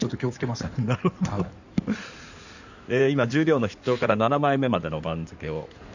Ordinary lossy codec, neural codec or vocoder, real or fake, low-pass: none; codec, 16 kHz in and 24 kHz out, 2.2 kbps, FireRedTTS-2 codec; fake; 7.2 kHz